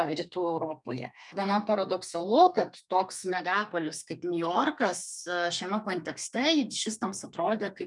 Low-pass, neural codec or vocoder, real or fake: 10.8 kHz; codec, 32 kHz, 1.9 kbps, SNAC; fake